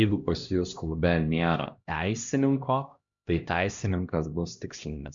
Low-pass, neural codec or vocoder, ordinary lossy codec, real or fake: 7.2 kHz; codec, 16 kHz, 1 kbps, X-Codec, HuBERT features, trained on LibriSpeech; Opus, 64 kbps; fake